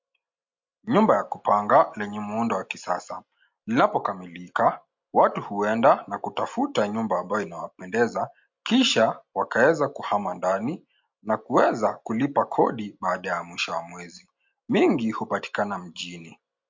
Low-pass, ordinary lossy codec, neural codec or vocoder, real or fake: 7.2 kHz; MP3, 48 kbps; none; real